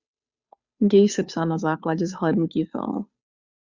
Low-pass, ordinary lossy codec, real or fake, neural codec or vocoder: 7.2 kHz; Opus, 64 kbps; fake; codec, 16 kHz, 2 kbps, FunCodec, trained on Chinese and English, 25 frames a second